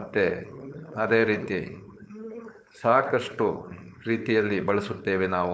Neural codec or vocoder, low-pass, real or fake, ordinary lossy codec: codec, 16 kHz, 4.8 kbps, FACodec; none; fake; none